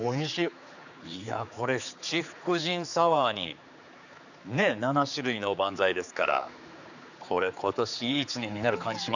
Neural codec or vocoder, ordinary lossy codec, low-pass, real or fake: codec, 16 kHz, 4 kbps, X-Codec, HuBERT features, trained on general audio; none; 7.2 kHz; fake